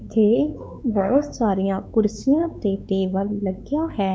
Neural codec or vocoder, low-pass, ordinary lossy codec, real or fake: codec, 16 kHz, 4 kbps, X-Codec, WavLM features, trained on Multilingual LibriSpeech; none; none; fake